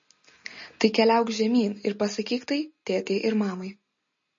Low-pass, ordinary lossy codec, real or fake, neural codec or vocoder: 7.2 kHz; MP3, 32 kbps; real; none